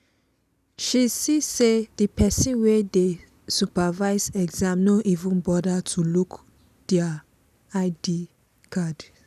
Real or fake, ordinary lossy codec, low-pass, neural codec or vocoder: real; none; 14.4 kHz; none